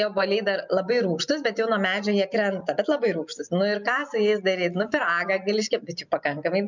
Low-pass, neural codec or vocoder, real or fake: 7.2 kHz; none; real